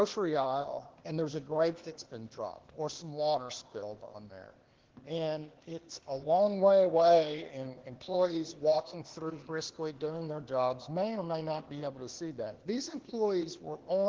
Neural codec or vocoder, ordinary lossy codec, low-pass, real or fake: codec, 16 kHz, 0.8 kbps, ZipCodec; Opus, 16 kbps; 7.2 kHz; fake